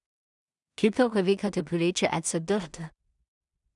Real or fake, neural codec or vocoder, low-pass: fake; codec, 16 kHz in and 24 kHz out, 0.4 kbps, LongCat-Audio-Codec, two codebook decoder; 10.8 kHz